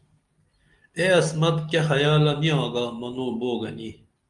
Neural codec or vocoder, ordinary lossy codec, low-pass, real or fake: none; Opus, 32 kbps; 10.8 kHz; real